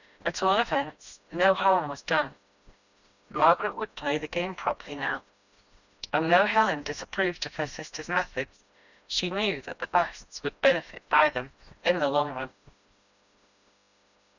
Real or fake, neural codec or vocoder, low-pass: fake; codec, 16 kHz, 1 kbps, FreqCodec, smaller model; 7.2 kHz